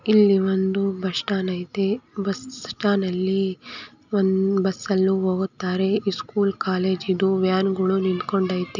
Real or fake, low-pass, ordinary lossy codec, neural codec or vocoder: real; 7.2 kHz; none; none